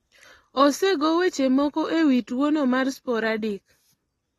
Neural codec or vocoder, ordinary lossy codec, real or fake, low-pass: none; AAC, 32 kbps; real; 19.8 kHz